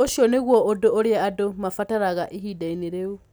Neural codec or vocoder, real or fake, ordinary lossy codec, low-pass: none; real; none; none